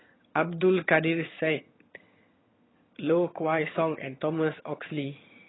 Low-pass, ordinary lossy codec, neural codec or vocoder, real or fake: 7.2 kHz; AAC, 16 kbps; vocoder, 22.05 kHz, 80 mel bands, HiFi-GAN; fake